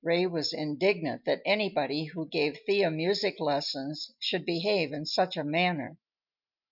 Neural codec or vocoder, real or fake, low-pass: none; real; 5.4 kHz